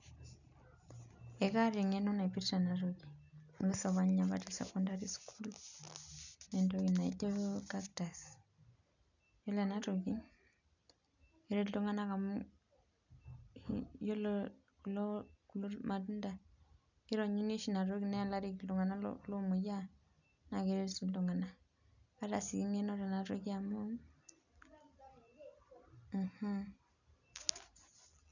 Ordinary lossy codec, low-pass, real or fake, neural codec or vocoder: none; 7.2 kHz; real; none